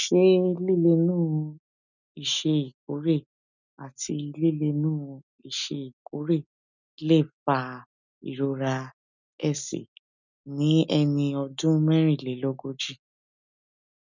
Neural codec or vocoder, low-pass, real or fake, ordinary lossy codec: none; 7.2 kHz; real; none